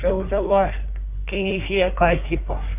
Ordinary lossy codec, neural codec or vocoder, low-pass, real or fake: none; codec, 24 kHz, 1 kbps, SNAC; 3.6 kHz; fake